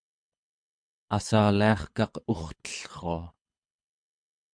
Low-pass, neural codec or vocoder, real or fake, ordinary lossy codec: 9.9 kHz; codec, 24 kHz, 6 kbps, HILCodec; fake; MP3, 64 kbps